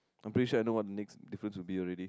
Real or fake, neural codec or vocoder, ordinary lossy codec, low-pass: real; none; none; none